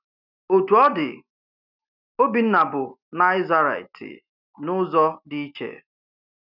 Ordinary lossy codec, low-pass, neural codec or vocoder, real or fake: none; 5.4 kHz; none; real